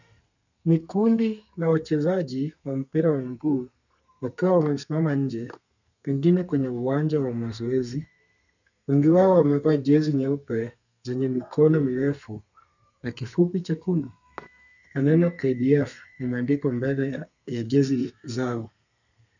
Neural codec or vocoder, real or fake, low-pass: codec, 32 kHz, 1.9 kbps, SNAC; fake; 7.2 kHz